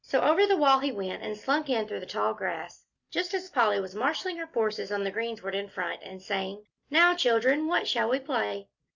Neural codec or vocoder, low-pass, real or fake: none; 7.2 kHz; real